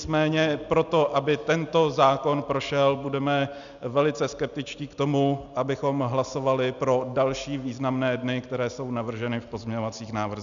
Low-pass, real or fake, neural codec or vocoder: 7.2 kHz; real; none